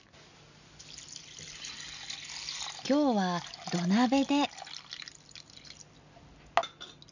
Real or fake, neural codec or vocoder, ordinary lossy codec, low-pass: real; none; none; 7.2 kHz